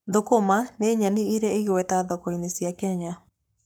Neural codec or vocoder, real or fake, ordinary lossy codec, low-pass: codec, 44.1 kHz, 7.8 kbps, Pupu-Codec; fake; none; none